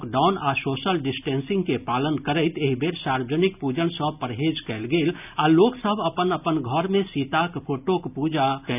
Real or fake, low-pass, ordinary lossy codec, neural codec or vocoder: real; 3.6 kHz; none; none